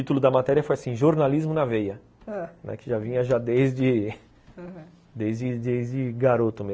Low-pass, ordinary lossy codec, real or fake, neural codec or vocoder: none; none; real; none